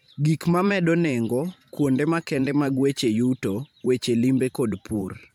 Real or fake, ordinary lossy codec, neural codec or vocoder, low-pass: fake; MP3, 96 kbps; vocoder, 44.1 kHz, 128 mel bands every 512 samples, BigVGAN v2; 19.8 kHz